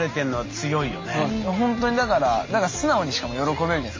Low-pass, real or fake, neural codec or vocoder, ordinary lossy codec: 7.2 kHz; real; none; MP3, 32 kbps